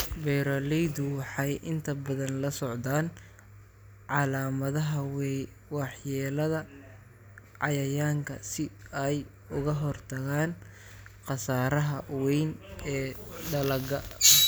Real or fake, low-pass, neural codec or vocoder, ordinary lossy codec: real; none; none; none